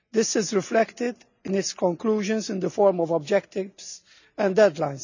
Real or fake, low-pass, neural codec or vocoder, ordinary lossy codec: real; 7.2 kHz; none; none